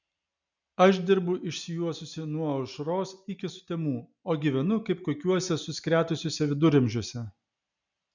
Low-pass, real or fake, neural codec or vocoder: 7.2 kHz; real; none